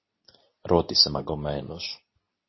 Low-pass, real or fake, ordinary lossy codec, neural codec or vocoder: 7.2 kHz; fake; MP3, 24 kbps; codec, 24 kHz, 0.9 kbps, WavTokenizer, medium speech release version 2